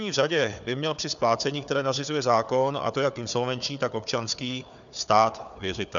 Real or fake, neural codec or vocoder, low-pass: fake; codec, 16 kHz, 4 kbps, FunCodec, trained on Chinese and English, 50 frames a second; 7.2 kHz